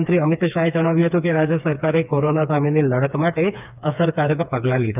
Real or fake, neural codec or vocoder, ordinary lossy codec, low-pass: fake; codec, 16 kHz, 4 kbps, FreqCodec, smaller model; none; 3.6 kHz